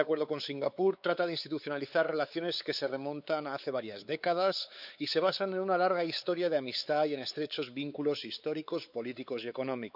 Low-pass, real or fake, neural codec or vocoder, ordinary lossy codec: 5.4 kHz; fake; codec, 16 kHz, 4 kbps, X-Codec, WavLM features, trained on Multilingual LibriSpeech; none